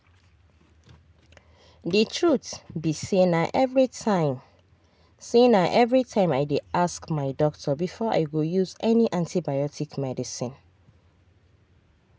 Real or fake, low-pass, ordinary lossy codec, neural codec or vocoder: real; none; none; none